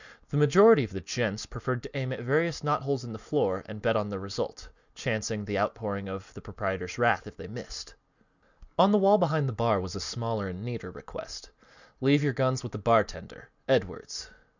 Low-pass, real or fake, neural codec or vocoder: 7.2 kHz; real; none